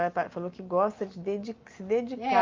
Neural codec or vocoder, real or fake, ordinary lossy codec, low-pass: none; real; Opus, 24 kbps; 7.2 kHz